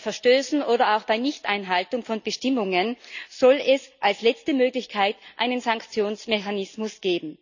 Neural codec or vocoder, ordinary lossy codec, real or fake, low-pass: none; none; real; 7.2 kHz